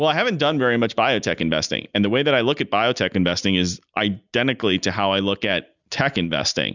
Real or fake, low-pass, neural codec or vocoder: real; 7.2 kHz; none